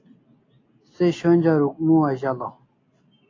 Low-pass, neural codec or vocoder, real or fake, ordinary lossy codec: 7.2 kHz; none; real; AAC, 32 kbps